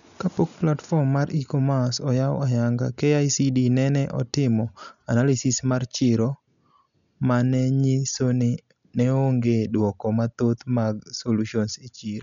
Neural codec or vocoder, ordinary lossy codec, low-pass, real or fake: none; none; 7.2 kHz; real